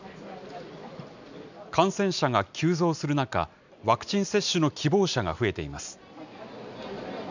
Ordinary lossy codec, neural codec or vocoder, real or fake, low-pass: none; none; real; 7.2 kHz